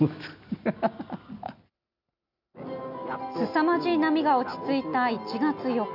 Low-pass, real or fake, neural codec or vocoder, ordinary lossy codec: 5.4 kHz; real; none; none